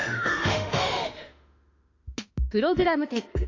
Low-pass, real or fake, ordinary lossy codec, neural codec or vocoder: 7.2 kHz; fake; AAC, 48 kbps; autoencoder, 48 kHz, 32 numbers a frame, DAC-VAE, trained on Japanese speech